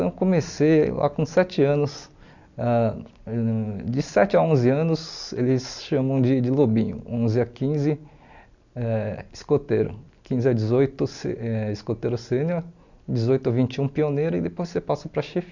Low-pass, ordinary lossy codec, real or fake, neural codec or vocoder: 7.2 kHz; none; real; none